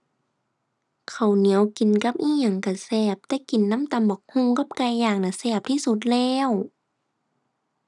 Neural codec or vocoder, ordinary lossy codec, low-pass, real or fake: none; none; none; real